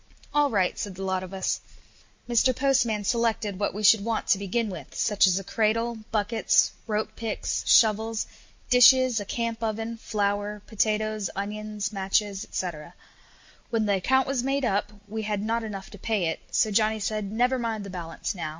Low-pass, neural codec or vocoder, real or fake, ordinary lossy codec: 7.2 kHz; none; real; MP3, 48 kbps